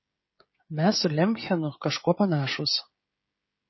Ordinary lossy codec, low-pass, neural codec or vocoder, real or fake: MP3, 24 kbps; 7.2 kHz; codec, 16 kHz, 16 kbps, FreqCodec, smaller model; fake